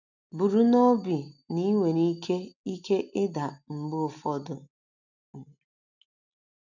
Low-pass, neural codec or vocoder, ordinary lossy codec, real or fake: 7.2 kHz; none; none; real